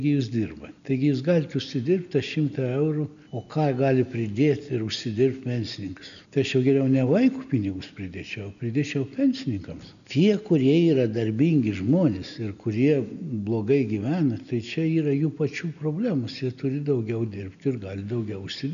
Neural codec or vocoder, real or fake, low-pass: none; real; 7.2 kHz